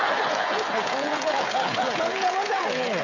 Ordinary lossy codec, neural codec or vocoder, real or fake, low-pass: AAC, 32 kbps; codec, 16 kHz, 16 kbps, FreqCodec, smaller model; fake; 7.2 kHz